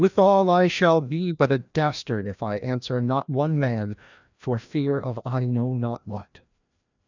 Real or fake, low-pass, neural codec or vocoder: fake; 7.2 kHz; codec, 16 kHz, 1 kbps, FreqCodec, larger model